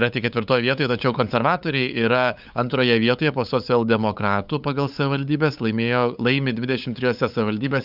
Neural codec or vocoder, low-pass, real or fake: codec, 16 kHz, 8 kbps, FunCodec, trained on LibriTTS, 25 frames a second; 5.4 kHz; fake